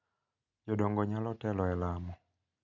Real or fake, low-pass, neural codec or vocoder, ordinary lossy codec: real; 7.2 kHz; none; none